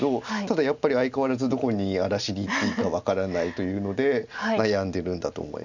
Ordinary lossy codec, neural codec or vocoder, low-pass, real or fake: none; none; 7.2 kHz; real